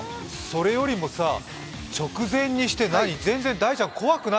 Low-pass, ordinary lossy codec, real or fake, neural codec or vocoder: none; none; real; none